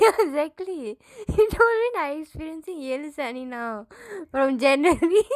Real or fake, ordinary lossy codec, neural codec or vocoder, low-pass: real; none; none; 14.4 kHz